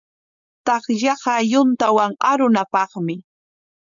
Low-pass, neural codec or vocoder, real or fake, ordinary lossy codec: 7.2 kHz; codec, 16 kHz, 4.8 kbps, FACodec; fake; MP3, 96 kbps